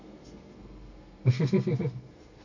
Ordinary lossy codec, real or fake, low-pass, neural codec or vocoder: AAC, 48 kbps; fake; 7.2 kHz; codec, 32 kHz, 1.9 kbps, SNAC